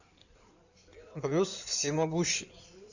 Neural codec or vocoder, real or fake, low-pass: codec, 16 kHz in and 24 kHz out, 2.2 kbps, FireRedTTS-2 codec; fake; 7.2 kHz